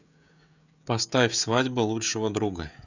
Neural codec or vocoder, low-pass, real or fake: codec, 16 kHz, 16 kbps, FreqCodec, smaller model; 7.2 kHz; fake